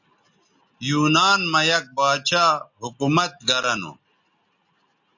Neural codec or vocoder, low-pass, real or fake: none; 7.2 kHz; real